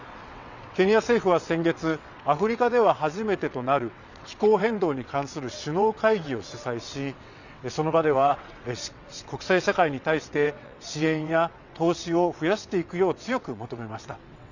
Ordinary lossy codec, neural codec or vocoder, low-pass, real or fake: none; vocoder, 22.05 kHz, 80 mel bands, WaveNeXt; 7.2 kHz; fake